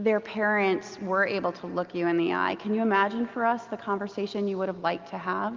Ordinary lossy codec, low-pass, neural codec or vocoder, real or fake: Opus, 24 kbps; 7.2 kHz; none; real